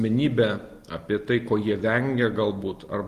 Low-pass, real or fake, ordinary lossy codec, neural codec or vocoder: 14.4 kHz; real; Opus, 24 kbps; none